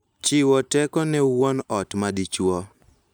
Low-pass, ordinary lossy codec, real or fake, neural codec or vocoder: none; none; real; none